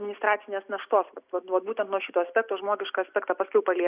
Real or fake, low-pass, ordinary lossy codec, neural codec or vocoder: real; 3.6 kHz; Opus, 64 kbps; none